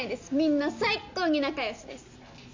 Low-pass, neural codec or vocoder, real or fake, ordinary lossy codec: 7.2 kHz; none; real; MP3, 48 kbps